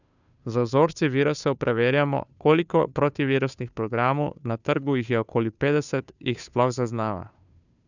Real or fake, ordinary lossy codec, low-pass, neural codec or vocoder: fake; none; 7.2 kHz; codec, 16 kHz, 2 kbps, FunCodec, trained on Chinese and English, 25 frames a second